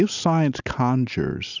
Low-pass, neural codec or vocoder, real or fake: 7.2 kHz; none; real